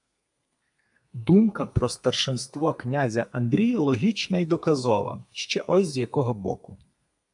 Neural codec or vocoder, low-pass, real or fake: codec, 32 kHz, 1.9 kbps, SNAC; 10.8 kHz; fake